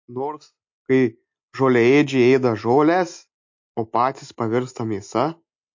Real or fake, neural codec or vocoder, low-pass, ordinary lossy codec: real; none; 7.2 kHz; MP3, 48 kbps